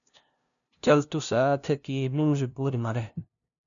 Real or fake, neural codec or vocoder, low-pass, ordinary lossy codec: fake; codec, 16 kHz, 0.5 kbps, FunCodec, trained on LibriTTS, 25 frames a second; 7.2 kHz; MP3, 96 kbps